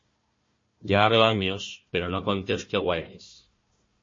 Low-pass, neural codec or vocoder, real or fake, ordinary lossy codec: 7.2 kHz; codec, 16 kHz, 1 kbps, FunCodec, trained on Chinese and English, 50 frames a second; fake; MP3, 32 kbps